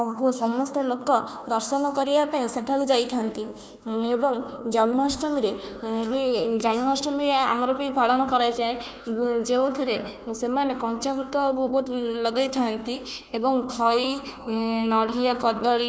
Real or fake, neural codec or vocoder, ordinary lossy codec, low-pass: fake; codec, 16 kHz, 1 kbps, FunCodec, trained on Chinese and English, 50 frames a second; none; none